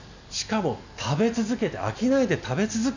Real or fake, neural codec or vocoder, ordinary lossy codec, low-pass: real; none; none; 7.2 kHz